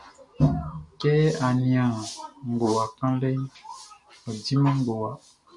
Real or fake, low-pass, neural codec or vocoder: real; 10.8 kHz; none